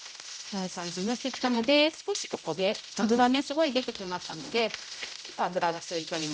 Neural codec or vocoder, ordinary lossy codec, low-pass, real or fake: codec, 16 kHz, 0.5 kbps, X-Codec, HuBERT features, trained on general audio; none; none; fake